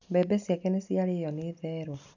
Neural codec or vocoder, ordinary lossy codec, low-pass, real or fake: none; none; 7.2 kHz; real